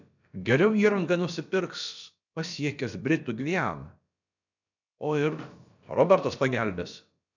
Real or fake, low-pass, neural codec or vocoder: fake; 7.2 kHz; codec, 16 kHz, about 1 kbps, DyCAST, with the encoder's durations